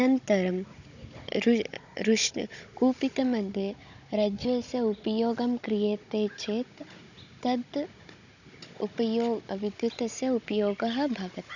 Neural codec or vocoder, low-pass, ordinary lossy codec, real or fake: codec, 16 kHz, 16 kbps, FunCodec, trained on Chinese and English, 50 frames a second; 7.2 kHz; none; fake